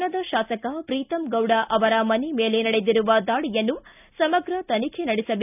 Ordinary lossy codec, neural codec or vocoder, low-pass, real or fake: none; none; 3.6 kHz; real